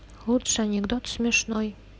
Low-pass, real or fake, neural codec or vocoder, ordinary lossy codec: none; real; none; none